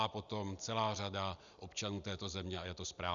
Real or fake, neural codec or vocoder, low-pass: real; none; 7.2 kHz